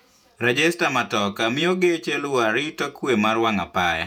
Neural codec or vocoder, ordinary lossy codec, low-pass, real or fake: vocoder, 48 kHz, 128 mel bands, Vocos; none; 19.8 kHz; fake